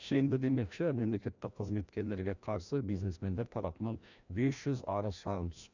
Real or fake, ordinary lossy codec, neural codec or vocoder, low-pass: fake; none; codec, 16 kHz, 1 kbps, FreqCodec, larger model; 7.2 kHz